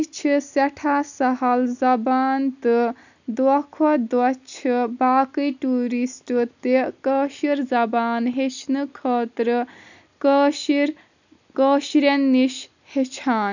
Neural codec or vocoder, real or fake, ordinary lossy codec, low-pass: none; real; none; 7.2 kHz